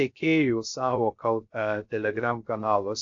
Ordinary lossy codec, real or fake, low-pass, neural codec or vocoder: AAC, 48 kbps; fake; 7.2 kHz; codec, 16 kHz, 0.3 kbps, FocalCodec